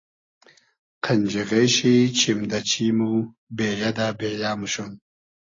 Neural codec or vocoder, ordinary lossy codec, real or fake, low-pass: none; AAC, 32 kbps; real; 7.2 kHz